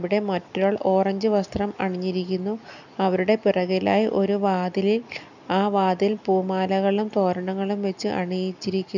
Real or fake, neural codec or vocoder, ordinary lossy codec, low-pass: real; none; none; 7.2 kHz